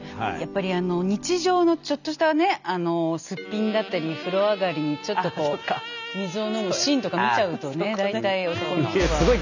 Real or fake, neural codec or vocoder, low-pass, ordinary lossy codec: real; none; 7.2 kHz; none